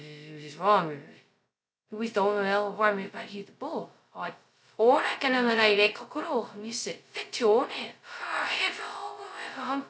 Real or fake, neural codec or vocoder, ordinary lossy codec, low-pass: fake; codec, 16 kHz, 0.2 kbps, FocalCodec; none; none